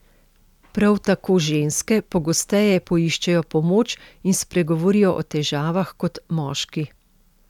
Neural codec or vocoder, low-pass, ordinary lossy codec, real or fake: none; 19.8 kHz; none; real